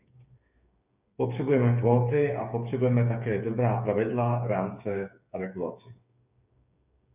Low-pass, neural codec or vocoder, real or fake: 3.6 kHz; codec, 16 kHz, 8 kbps, FreqCodec, smaller model; fake